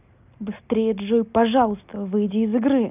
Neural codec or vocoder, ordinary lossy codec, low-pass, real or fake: none; none; 3.6 kHz; real